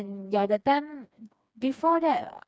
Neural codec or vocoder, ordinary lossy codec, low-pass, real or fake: codec, 16 kHz, 2 kbps, FreqCodec, smaller model; none; none; fake